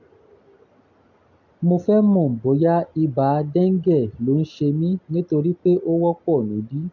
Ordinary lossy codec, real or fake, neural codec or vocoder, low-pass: none; real; none; 7.2 kHz